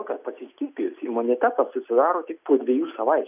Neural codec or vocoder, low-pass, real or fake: none; 3.6 kHz; real